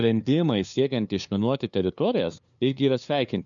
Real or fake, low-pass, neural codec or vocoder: fake; 7.2 kHz; codec, 16 kHz, 2 kbps, FunCodec, trained on LibriTTS, 25 frames a second